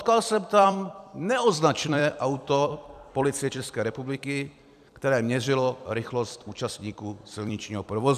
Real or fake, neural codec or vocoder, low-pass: fake; vocoder, 44.1 kHz, 128 mel bands every 256 samples, BigVGAN v2; 14.4 kHz